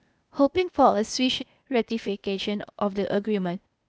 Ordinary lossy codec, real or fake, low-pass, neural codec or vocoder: none; fake; none; codec, 16 kHz, 0.8 kbps, ZipCodec